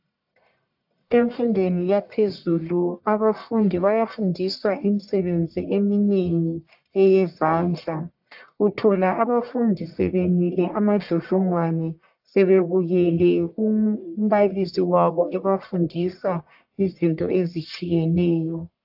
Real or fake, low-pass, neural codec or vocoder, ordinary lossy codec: fake; 5.4 kHz; codec, 44.1 kHz, 1.7 kbps, Pupu-Codec; AAC, 48 kbps